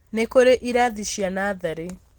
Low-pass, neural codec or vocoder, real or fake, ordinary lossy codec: 19.8 kHz; none; real; Opus, 16 kbps